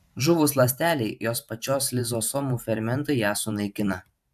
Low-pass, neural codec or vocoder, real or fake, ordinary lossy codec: 14.4 kHz; vocoder, 44.1 kHz, 128 mel bands every 512 samples, BigVGAN v2; fake; AAC, 96 kbps